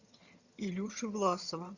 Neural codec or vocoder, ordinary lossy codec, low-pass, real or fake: vocoder, 22.05 kHz, 80 mel bands, HiFi-GAN; Opus, 64 kbps; 7.2 kHz; fake